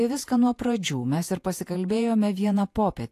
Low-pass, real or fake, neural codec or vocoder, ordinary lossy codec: 14.4 kHz; fake; vocoder, 48 kHz, 128 mel bands, Vocos; AAC, 64 kbps